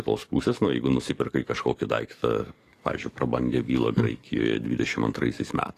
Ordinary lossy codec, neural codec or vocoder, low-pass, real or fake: AAC, 48 kbps; codec, 44.1 kHz, 7.8 kbps, DAC; 14.4 kHz; fake